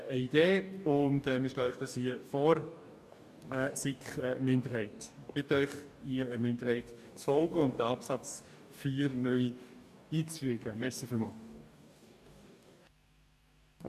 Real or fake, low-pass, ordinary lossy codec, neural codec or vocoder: fake; 14.4 kHz; none; codec, 44.1 kHz, 2.6 kbps, DAC